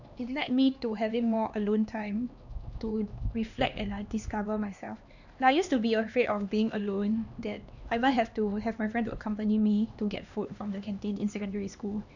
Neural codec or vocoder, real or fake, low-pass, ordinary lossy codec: codec, 16 kHz, 2 kbps, X-Codec, HuBERT features, trained on LibriSpeech; fake; 7.2 kHz; none